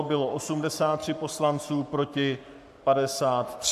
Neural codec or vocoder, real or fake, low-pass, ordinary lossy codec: codec, 44.1 kHz, 7.8 kbps, Pupu-Codec; fake; 14.4 kHz; AAC, 96 kbps